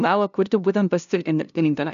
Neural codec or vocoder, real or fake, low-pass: codec, 16 kHz, 0.5 kbps, FunCodec, trained on LibriTTS, 25 frames a second; fake; 7.2 kHz